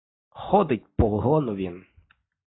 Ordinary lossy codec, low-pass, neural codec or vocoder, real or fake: AAC, 16 kbps; 7.2 kHz; none; real